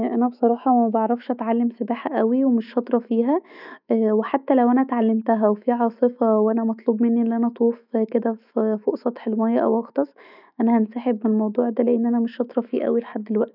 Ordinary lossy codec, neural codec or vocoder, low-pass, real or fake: none; autoencoder, 48 kHz, 128 numbers a frame, DAC-VAE, trained on Japanese speech; 5.4 kHz; fake